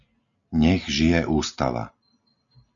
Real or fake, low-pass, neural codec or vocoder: real; 7.2 kHz; none